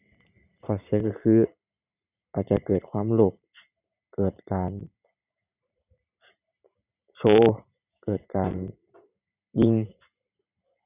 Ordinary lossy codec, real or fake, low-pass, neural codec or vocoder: none; fake; 3.6 kHz; vocoder, 44.1 kHz, 128 mel bands every 512 samples, BigVGAN v2